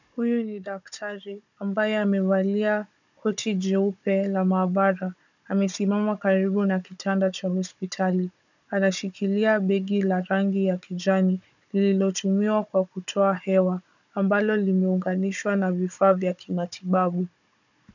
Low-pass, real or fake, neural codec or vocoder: 7.2 kHz; fake; codec, 16 kHz, 16 kbps, FunCodec, trained on Chinese and English, 50 frames a second